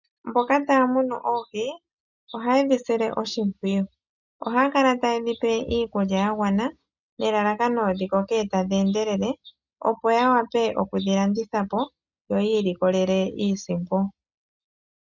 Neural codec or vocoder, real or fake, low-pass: none; real; 7.2 kHz